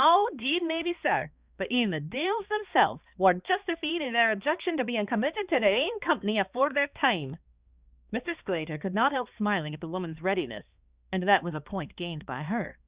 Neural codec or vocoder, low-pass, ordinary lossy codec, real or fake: codec, 16 kHz, 2 kbps, X-Codec, HuBERT features, trained on balanced general audio; 3.6 kHz; Opus, 64 kbps; fake